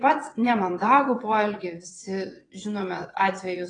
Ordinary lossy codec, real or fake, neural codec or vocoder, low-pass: AAC, 32 kbps; fake; vocoder, 22.05 kHz, 80 mel bands, WaveNeXt; 9.9 kHz